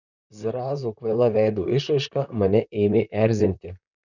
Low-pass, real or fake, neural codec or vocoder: 7.2 kHz; fake; vocoder, 44.1 kHz, 128 mel bands, Pupu-Vocoder